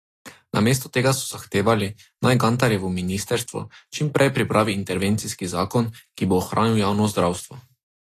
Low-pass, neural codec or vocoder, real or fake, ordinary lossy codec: 14.4 kHz; none; real; AAC, 48 kbps